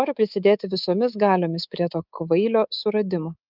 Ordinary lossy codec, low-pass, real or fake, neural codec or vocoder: Opus, 24 kbps; 5.4 kHz; fake; codec, 24 kHz, 3.1 kbps, DualCodec